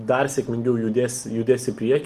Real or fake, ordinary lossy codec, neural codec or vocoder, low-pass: real; Opus, 24 kbps; none; 14.4 kHz